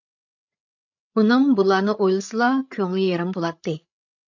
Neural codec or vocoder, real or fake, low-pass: codec, 16 kHz, 8 kbps, FreqCodec, larger model; fake; 7.2 kHz